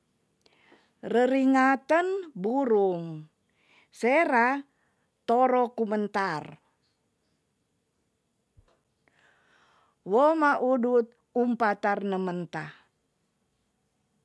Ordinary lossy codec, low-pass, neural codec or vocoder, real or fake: none; none; none; real